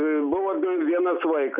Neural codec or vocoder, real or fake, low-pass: none; real; 3.6 kHz